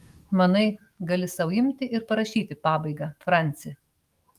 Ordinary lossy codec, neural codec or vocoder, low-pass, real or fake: Opus, 32 kbps; autoencoder, 48 kHz, 128 numbers a frame, DAC-VAE, trained on Japanese speech; 14.4 kHz; fake